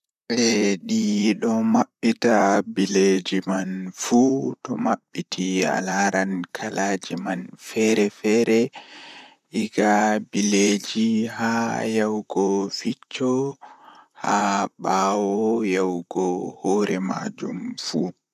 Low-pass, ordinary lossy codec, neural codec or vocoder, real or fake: 14.4 kHz; none; vocoder, 44.1 kHz, 128 mel bands, Pupu-Vocoder; fake